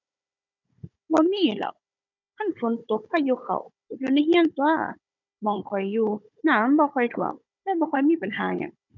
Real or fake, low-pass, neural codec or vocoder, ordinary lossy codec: fake; 7.2 kHz; codec, 16 kHz, 16 kbps, FunCodec, trained on Chinese and English, 50 frames a second; none